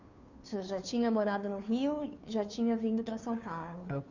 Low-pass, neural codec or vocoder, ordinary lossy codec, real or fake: 7.2 kHz; codec, 16 kHz, 2 kbps, FunCodec, trained on Chinese and English, 25 frames a second; none; fake